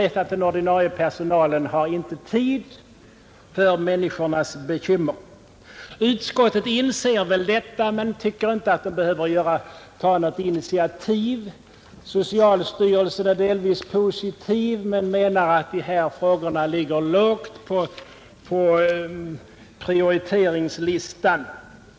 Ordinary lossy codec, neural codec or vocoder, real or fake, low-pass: none; none; real; none